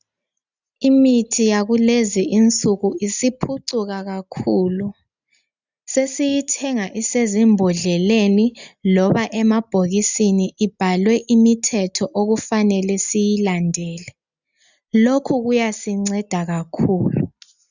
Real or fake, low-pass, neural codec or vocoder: real; 7.2 kHz; none